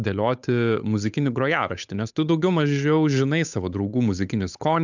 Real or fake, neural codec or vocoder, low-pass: fake; codec, 16 kHz, 8 kbps, FunCodec, trained on Chinese and English, 25 frames a second; 7.2 kHz